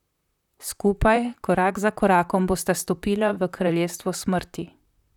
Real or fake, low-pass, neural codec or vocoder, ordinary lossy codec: fake; 19.8 kHz; vocoder, 44.1 kHz, 128 mel bands, Pupu-Vocoder; none